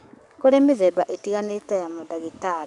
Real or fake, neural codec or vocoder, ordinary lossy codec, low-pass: fake; codec, 44.1 kHz, 7.8 kbps, DAC; none; 10.8 kHz